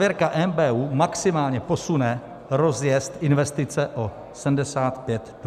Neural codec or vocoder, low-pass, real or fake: none; 14.4 kHz; real